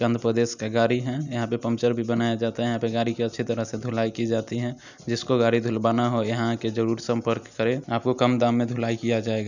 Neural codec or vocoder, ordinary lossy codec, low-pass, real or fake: none; none; 7.2 kHz; real